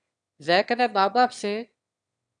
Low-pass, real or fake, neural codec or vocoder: 9.9 kHz; fake; autoencoder, 22.05 kHz, a latent of 192 numbers a frame, VITS, trained on one speaker